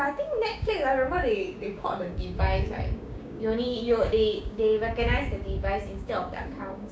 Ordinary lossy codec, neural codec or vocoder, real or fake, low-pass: none; codec, 16 kHz, 6 kbps, DAC; fake; none